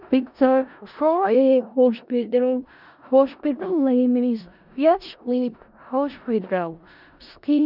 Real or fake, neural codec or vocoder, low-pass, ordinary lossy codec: fake; codec, 16 kHz in and 24 kHz out, 0.4 kbps, LongCat-Audio-Codec, four codebook decoder; 5.4 kHz; none